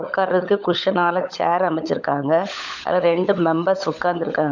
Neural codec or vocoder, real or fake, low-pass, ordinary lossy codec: codec, 16 kHz, 16 kbps, FunCodec, trained on LibriTTS, 50 frames a second; fake; 7.2 kHz; none